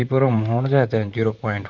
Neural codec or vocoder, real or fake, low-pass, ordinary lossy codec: vocoder, 44.1 kHz, 128 mel bands, Pupu-Vocoder; fake; 7.2 kHz; none